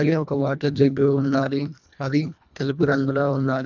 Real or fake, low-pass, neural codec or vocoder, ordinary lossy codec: fake; 7.2 kHz; codec, 24 kHz, 1.5 kbps, HILCodec; none